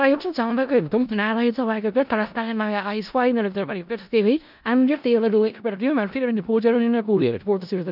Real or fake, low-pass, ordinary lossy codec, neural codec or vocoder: fake; 5.4 kHz; none; codec, 16 kHz in and 24 kHz out, 0.4 kbps, LongCat-Audio-Codec, four codebook decoder